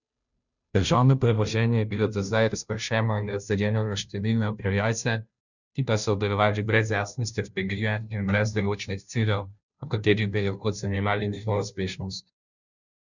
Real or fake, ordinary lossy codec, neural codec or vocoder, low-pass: fake; none; codec, 16 kHz, 0.5 kbps, FunCodec, trained on Chinese and English, 25 frames a second; 7.2 kHz